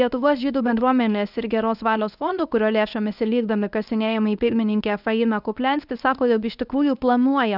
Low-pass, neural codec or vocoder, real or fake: 5.4 kHz; codec, 24 kHz, 0.9 kbps, WavTokenizer, medium speech release version 1; fake